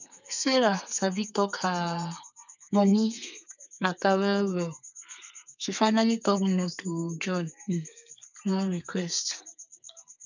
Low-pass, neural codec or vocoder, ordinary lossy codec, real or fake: 7.2 kHz; codec, 32 kHz, 1.9 kbps, SNAC; none; fake